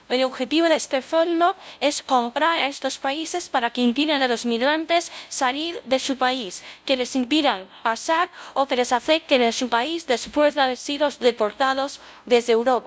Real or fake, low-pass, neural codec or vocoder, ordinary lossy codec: fake; none; codec, 16 kHz, 0.5 kbps, FunCodec, trained on LibriTTS, 25 frames a second; none